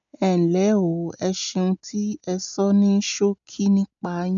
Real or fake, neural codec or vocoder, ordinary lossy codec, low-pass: real; none; none; 7.2 kHz